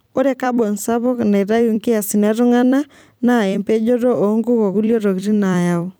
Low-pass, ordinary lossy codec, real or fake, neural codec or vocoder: none; none; fake; vocoder, 44.1 kHz, 128 mel bands every 256 samples, BigVGAN v2